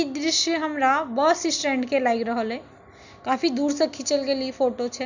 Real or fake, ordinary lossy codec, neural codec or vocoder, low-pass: real; none; none; 7.2 kHz